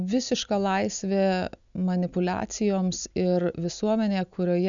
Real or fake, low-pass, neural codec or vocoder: real; 7.2 kHz; none